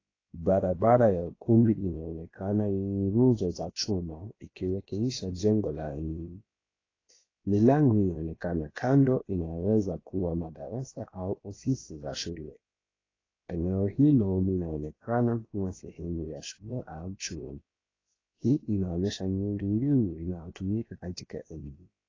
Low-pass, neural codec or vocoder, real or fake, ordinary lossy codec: 7.2 kHz; codec, 16 kHz, about 1 kbps, DyCAST, with the encoder's durations; fake; AAC, 32 kbps